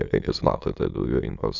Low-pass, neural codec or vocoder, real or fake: 7.2 kHz; autoencoder, 22.05 kHz, a latent of 192 numbers a frame, VITS, trained on many speakers; fake